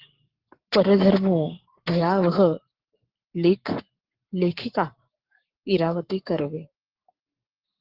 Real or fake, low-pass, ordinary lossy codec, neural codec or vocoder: fake; 5.4 kHz; Opus, 16 kbps; codec, 44.1 kHz, 7.8 kbps, Pupu-Codec